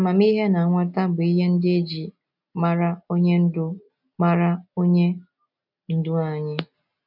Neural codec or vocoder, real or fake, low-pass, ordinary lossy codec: none; real; 5.4 kHz; none